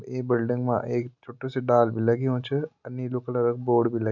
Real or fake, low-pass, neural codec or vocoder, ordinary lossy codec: real; 7.2 kHz; none; none